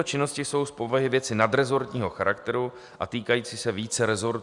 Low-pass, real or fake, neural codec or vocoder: 10.8 kHz; real; none